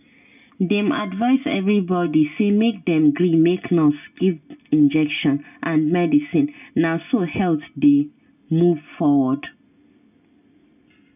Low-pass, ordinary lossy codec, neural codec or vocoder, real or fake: 3.6 kHz; none; none; real